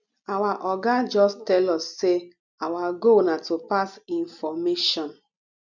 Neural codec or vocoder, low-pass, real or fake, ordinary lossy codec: none; 7.2 kHz; real; none